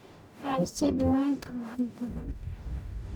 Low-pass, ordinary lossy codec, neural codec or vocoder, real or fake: 19.8 kHz; none; codec, 44.1 kHz, 0.9 kbps, DAC; fake